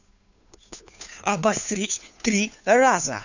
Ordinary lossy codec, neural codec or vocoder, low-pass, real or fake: none; codec, 16 kHz, 2 kbps, FunCodec, trained on Chinese and English, 25 frames a second; 7.2 kHz; fake